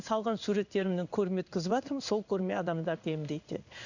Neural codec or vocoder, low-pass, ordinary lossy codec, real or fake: codec, 16 kHz in and 24 kHz out, 1 kbps, XY-Tokenizer; 7.2 kHz; none; fake